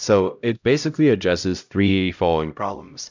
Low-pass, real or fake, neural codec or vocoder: 7.2 kHz; fake; codec, 16 kHz, 0.5 kbps, X-Codec, HuBERT features, trained on LibriSpeech